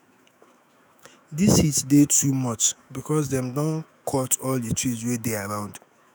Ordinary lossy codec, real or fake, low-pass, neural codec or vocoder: none; fake; none; autoencoder, 48 kHz, 128 numbers a frame, DAC-VAE, trained on Japanese speech